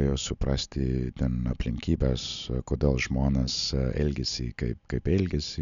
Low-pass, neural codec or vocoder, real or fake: 7.2 kHz; none; real